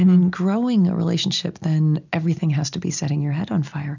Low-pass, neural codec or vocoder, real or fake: 7.2 kHz; none; real